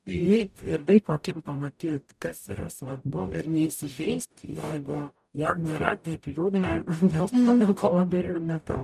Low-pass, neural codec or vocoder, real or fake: 14.4 kHz; codec, 44.1 kHz, 0.9 kbps, DAC; fake